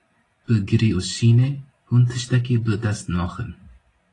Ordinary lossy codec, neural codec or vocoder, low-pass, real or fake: AAC, 32 kbps; none; 10.8 kHz; real